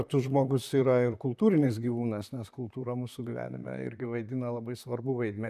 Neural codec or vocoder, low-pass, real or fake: vocoder, 44.1 kHz, 128 mel bands, Pupu-Vocoder; 14.4 kHz; fake